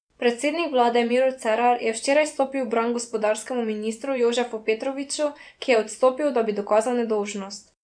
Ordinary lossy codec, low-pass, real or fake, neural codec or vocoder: none; 9.9 kHz; fake; vocoder, 24 kHz, 100 mel bands, Vocos